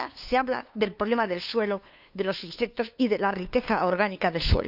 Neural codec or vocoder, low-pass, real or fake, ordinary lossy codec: codec, 16 kHz, 2 kbps, FunCodec, trained on LibriTTS, 25 frames a second; 5.4 kHz; fake; none